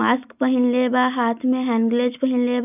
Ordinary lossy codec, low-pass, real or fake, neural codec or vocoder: none; 3.6 kHz; real; none